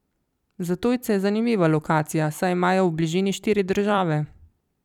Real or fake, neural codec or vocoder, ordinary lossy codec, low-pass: fake; vocoder, 44.1 kHz, 128 mel bands every 256 samples, BigVGAN v2; none; 19.8 kHz